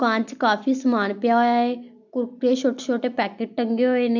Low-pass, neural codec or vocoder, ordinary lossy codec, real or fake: 7.2 kHz; none; MP3, 64 kbps; real